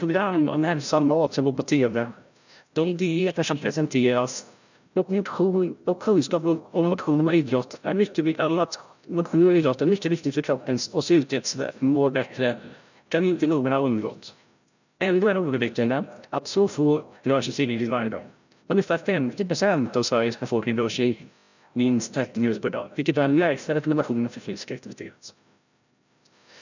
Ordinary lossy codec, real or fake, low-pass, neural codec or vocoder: none; fake; 7.2 kHz; codec, 16 kHz, 0.5 kbps, FreqCodec, larger model